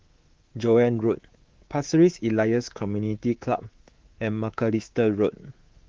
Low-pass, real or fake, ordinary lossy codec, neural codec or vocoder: 7.2 kHz; fake; Opus, 16 kbps; codec, 24 kHz, 3.1 kbps, DualCodec